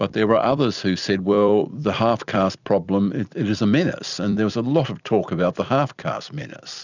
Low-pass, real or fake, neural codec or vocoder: 7.2 kHz; fake; vocoder, 44.1 kHz, 128 mel bands every 256 samples, BigVGAN v2